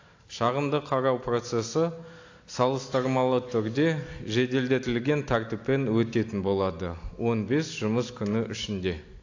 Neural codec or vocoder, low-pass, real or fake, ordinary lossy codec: none; 7.2 kHz; real; AAC, 48 kbps